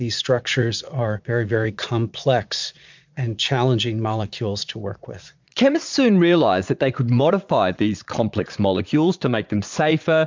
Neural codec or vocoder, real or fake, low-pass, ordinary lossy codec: vocoder, 22.05 kHz, 80 mel bands, Vocos; fake; 7.2 kHz; MP3, 64 kbps